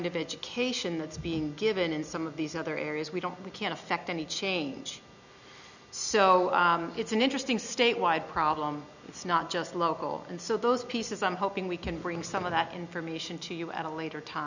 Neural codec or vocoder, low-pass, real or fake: none; 7.2 kHz; real